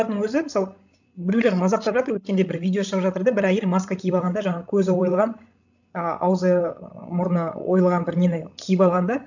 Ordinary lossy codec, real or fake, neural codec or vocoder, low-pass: none; fake; codec, 16 kHz, 16 kbps, FreqCodec, larger model; 7.2 kHz